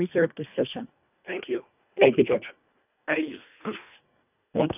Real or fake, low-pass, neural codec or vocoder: fake; 3.6 kHz; codec, 24 kHz, 1.5 kbps, HILCodec